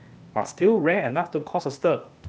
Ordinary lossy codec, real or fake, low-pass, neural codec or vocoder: none; fake; none; codec, 16 kHz, 0.8 kbps, ZipCodec